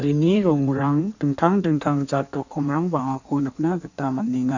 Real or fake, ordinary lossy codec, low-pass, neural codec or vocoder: fake; none; 7.2 kHz; codec, 16 kHz in and 24 kHz out, 1.1 kbps, FireRedTTS-2 codec